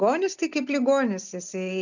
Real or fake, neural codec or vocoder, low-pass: real; none; 7.2 kHz